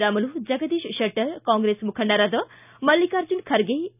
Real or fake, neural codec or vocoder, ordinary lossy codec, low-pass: real; none; none; 3.6 kHz